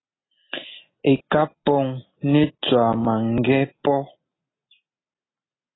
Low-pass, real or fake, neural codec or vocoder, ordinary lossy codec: 7.2 kHz; real; none; AAC, 16 kbps